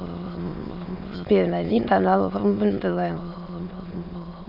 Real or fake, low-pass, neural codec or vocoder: fake; 5.4 kHz; autoencoder, 22.05 kHz, a latent of 192 numbers a frame, VITS, trained on many speakers